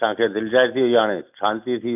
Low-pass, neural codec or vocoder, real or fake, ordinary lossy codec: 3.6 kHz; none; real; none